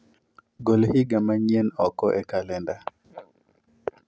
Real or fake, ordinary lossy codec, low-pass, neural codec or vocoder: real; none; none; none